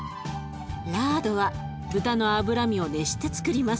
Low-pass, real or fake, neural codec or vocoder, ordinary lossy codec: none; real; none; none